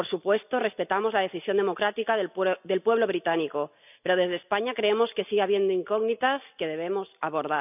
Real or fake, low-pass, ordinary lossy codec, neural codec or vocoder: real; 3.6 kHz; none; none